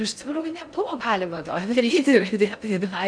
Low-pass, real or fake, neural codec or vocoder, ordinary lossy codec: 9.9 kHz; fake; codec, 16 kHz in and 24 kHz out, 0.6 kbps, FocalCodec, streaming, 4096 codes; MP3, 96 kbps